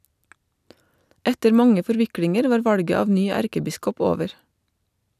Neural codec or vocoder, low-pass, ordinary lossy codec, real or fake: none; 14.4 kHz; none; real